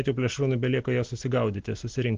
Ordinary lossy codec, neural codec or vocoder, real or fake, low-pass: Opus, 16 kbps; none; real; 7.2 kHz